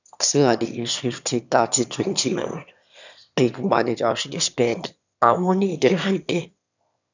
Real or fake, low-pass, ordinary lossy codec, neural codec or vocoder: fake; 7.2 kHz; none; autoencoder, 22.05 kHz, a latent of 192 numbers a frame, VITS, trained on one speaker